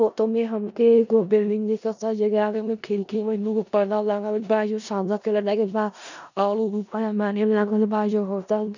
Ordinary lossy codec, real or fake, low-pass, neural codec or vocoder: none; fake; 7.2 kHz; codec, 16 kHz in and 24 kHz out, 0.4 kbps, LongCat-Audio-Codec, four codebook decoder